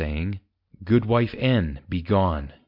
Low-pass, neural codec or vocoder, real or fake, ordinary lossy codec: 5.4 kHz; none; real; MP3, 48 kbps